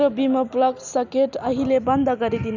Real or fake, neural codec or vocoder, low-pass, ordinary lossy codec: real; none; 7.2 kHz; none